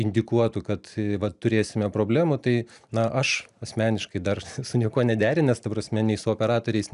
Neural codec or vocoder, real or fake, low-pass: none; real; 10.8 kHz